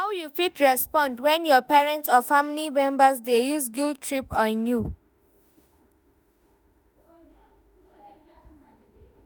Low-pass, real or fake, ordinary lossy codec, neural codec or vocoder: none; fake; none; autoencoder, 48 kHz, 32 numbers a frame, DAC-VAE, trained on Japanese speech